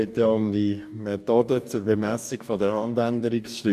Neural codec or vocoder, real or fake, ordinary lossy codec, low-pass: codec, 44.1 kHz, 2.6 kbps, DAC; fake; none; 14.4 kHz